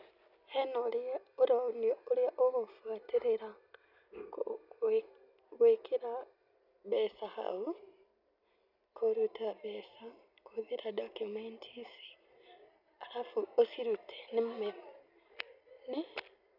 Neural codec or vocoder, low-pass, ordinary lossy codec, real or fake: none; 5.4 kHz; none; real